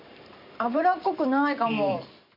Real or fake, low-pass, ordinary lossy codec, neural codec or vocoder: fake; 5.4 kHz; MP3, 32 kbps; vocoder, 44.1 kHz, 128 mel bands every 512 samples, BigVGAN v2